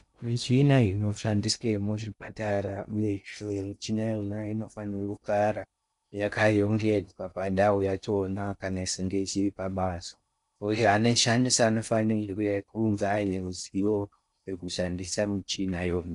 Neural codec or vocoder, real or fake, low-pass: codec, 16 kHz in and 24 kHz out, 0.6 kbps, FocalCodec, streaming, 2048 codes; fake; 10.8 kHz